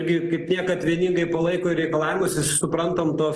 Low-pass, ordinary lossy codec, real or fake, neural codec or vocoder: 10.8 kHz; Opus, 16 kbps; real; none